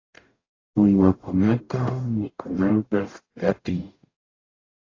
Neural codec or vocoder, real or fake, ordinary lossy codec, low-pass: codec, 44.1 kHz, 0.9 kbps, DAC; fake; AAC, 32 kbps; 7.2 kHz